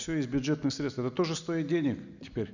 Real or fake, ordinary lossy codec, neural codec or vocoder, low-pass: real; none; none; 7.2 kHz